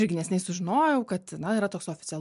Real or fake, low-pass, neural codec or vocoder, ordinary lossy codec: real; 10.8 kHz; none; MP3, 64 kbps